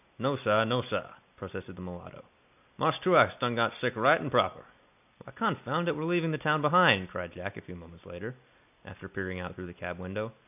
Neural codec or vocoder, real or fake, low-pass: none; real; 3.6 kHz